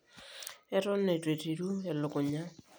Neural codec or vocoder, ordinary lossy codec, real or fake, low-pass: none; none; real; none